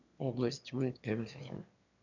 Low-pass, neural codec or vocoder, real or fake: 7.2 kHz; autoencoder, 22.05 kHz, a latent of 192 numbers a frame, VITS, trained on one speaker; fake